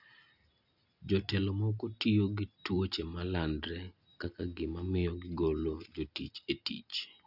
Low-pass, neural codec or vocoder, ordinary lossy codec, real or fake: 5.4 kHz; none; none; real